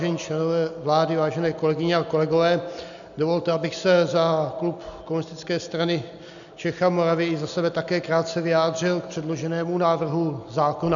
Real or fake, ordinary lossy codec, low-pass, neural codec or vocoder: real; MP3, 96 kbps; 7.2 kHz; none